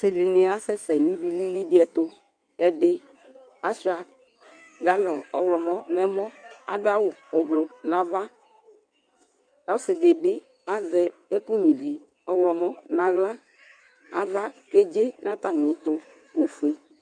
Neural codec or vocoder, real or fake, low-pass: codec, 16 kHz in and 24 kHz out, 1.1 kbps, FireRedTTS-2 codec; fake; 9.9 kHz